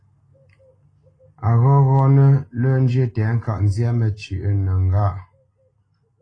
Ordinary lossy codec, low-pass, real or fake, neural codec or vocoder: AAC, 32 kbps; 9.9 kHz; real; none